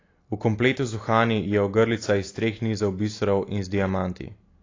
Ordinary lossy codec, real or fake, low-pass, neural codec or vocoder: AAC, 32 kbps; real; 7.2 kHz; none